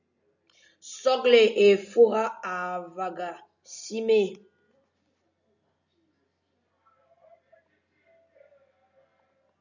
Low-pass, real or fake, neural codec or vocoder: 7.2 kHz; real; none